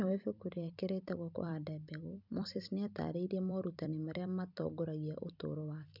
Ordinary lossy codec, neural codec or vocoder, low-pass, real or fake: none; vocoder, 44.1 kHz, 128 mel bands every 256 samples, BigVGAN v2; 5.4 kHz; fake